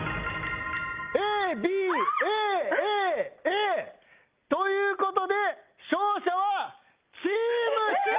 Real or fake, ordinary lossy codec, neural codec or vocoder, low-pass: real; Opus, 32 kbps; none; 3.6 kHz